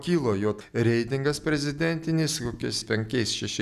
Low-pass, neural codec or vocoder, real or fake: 14.4 kHz; none; real